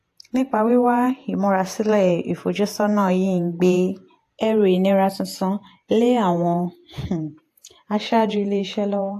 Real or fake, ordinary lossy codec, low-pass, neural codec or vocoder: fake; AAC, 64 kbps; 14.4 kHz; vocoder, 48 kHz, 128 mel bands, Vocos